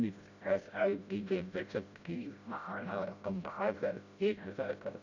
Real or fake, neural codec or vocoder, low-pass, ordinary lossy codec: fake; codec, 16 kHz, 0.5 kbps, FreqCodec, smaller model; 7.2 kHz; none